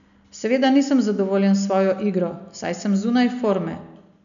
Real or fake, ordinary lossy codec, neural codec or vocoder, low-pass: real; none; none; 7.2 kHz